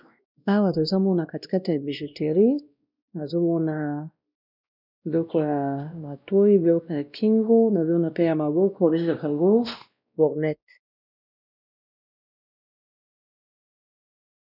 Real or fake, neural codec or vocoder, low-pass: fake; codec, 16 kHz, 1 kbps, X-Codec, WavLM features, trained on Multilingual LibriSpeech; 5.4 kHz